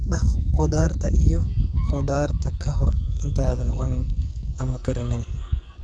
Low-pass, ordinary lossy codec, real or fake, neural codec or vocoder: 9.9 kHz; none; fake; codec, 44.1 kHz, 2.6 kbps, SNAC